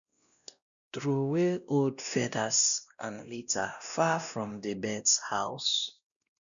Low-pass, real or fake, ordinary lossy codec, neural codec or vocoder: 7.2 kHz; fake; none; codec, 16 kHz, 1 kbps, X-Codec, WavLM features, trained on Multilingual LibriSpeech